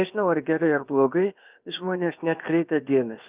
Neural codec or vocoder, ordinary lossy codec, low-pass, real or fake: codec, 16 kHz, 0.7 kbps, FocalCodec; Opus, 24 kbps; 3.6 kHz; fake